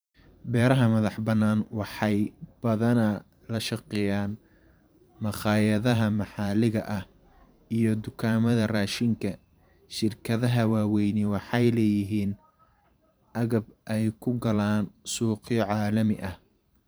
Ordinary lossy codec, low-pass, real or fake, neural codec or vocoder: none; none; fake; vocoder, 44.1 kHz, 128 mel bands every 512 samples, BigVGAN v2